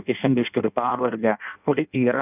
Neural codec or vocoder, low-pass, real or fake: codec, 16 kHz in and 24 kHz out, 0.6 kbps, FireRedTTS-2 codec; 3.6 kHz; fake